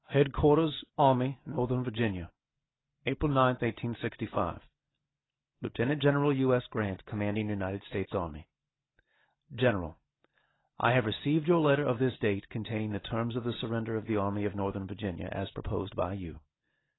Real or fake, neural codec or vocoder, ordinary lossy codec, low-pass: real; none; AAC, 16 kbps; 7.2 kHz